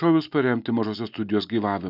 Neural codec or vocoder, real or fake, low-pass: none; real; 5.4 kHz